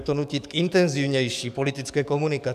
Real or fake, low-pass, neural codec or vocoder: fake; 14.4 kHz; codec, 44.1 kHz, 7.8 kbps, DAC